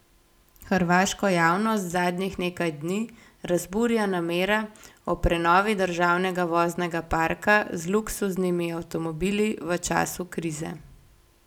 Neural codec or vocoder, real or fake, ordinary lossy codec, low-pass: none; real; none; 19.8 kHz